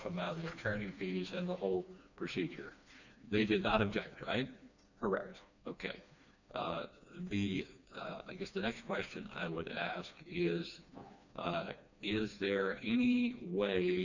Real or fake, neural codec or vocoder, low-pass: fake; codec, 16 kHz, 2 kbps, FreqCodec, smaller model; 7.2 kHz